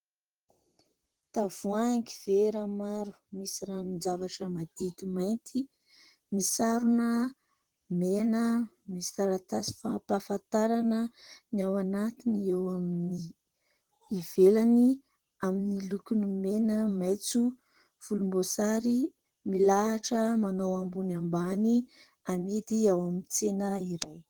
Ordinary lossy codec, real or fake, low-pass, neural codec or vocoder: Opus, 16 kbps; fake; 19.8 kHz; vocoder, 44.1 kHz, 128 mel bands, Pupu-Vocoder